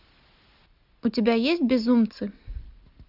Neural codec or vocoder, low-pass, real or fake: none; 5.4 kHz; real